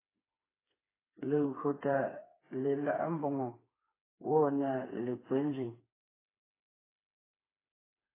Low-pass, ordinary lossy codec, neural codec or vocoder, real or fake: 3.6 kHz; AAC, 16 kbps; codec, 16 kHz, 4 kbps, FreqCodec, smaller model; fake